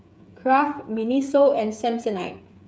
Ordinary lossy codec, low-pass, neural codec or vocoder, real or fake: none; none; codec, 16 kHz, 8 kbps, FreqCodec, smaller model; fake